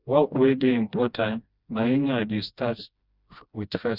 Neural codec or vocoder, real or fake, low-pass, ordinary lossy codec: codec, 16 kHz, 1 kbps, FreqCodec, smaller model; fake; 5.4 kHz; Opus, 64 kbps